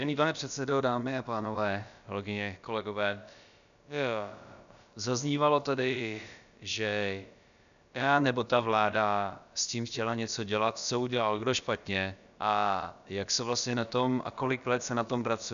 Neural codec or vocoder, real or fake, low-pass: codec, 16 kHz, about 1 kbps, DyCAST, with the encoder's durations; fake; 7.2 kHz